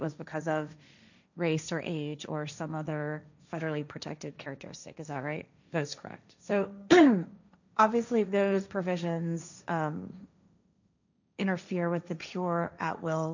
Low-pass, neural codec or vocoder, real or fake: 7.2 kHz; codec, 16 kHz, 1.1 kbps, Voila-Tokenizer; fake